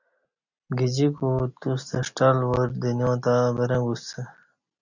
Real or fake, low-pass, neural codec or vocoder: real; 7.2 kHz; none